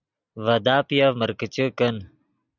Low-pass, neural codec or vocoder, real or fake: 7.2 kHz; none; real